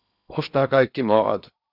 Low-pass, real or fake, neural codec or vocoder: 5.4 kHz; fake; codec, 16 kHz in and 24 kHz out, 0.8 kbps, FocalCodec, streaming, 65536 codes